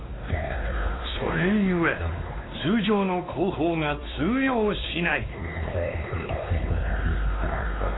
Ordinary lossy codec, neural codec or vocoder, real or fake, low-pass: AAC, 16 kbps; codec, 16 kHz, 2 kbps, X-Codec, WavLM features, trained on Multilingual LibriSpeech; fake; 7.2 kHz